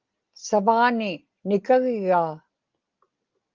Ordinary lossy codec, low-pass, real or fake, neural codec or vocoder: Opus, 32 kbps; 7.2 kHz; real; none